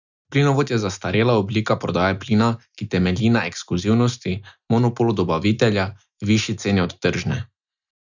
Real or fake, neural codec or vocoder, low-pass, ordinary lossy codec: real; none; 7.2 kHz; none